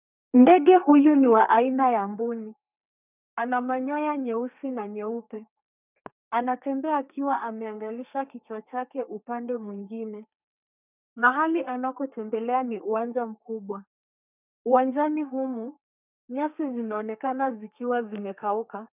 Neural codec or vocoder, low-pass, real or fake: codec, 32 kHz, 1.9 kbps, SNAC; 3.6 kHz; fake